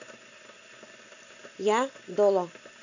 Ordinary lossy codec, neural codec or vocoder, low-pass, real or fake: none; none; 7.2 kHz; real